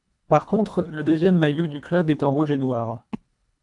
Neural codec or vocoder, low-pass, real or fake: codec, 24 kHz, 1.5 kbps, HILCodec; 10.8 kHz; fake